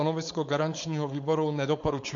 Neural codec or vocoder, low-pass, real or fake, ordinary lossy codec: codec, 16 kHz, 4.8 kbps, FACodec; 7.2 kHz; fake; AAC, 64 kbps